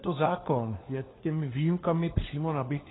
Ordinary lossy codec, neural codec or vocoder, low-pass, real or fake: AAC, 16 kbps; codec, 16 kHz, 4 kbps, FunCodec, trained on LibriTTS, 50 frames a second; 7.2 kHz; fake